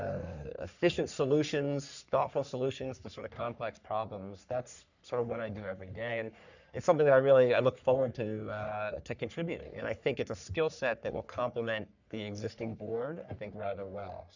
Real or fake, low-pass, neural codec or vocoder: fake; 7.2 kHz; codec, 44.1 kHz, 3.4 kbps, Pupu-Codec